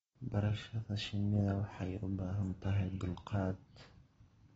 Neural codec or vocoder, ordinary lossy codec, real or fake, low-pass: none; AAC, 24 kbps; real; 19.8 kHz